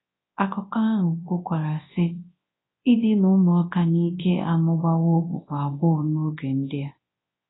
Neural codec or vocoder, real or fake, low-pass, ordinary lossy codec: codec, 24 kHz, 0.9 kbps, WavTokenizer, large speech release; fake; 7.2 kHz; AAC, 16 kbps